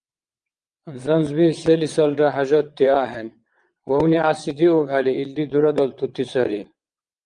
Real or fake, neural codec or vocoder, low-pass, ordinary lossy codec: fake; vocoder, 22.05 kHz, 80 mel bands, WaveNeXt; 9.9 kHz; Opus, 32 kbps